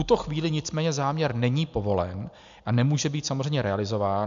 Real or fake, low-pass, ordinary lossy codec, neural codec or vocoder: real; 7.2 kHz; MP3, 64 kbps; none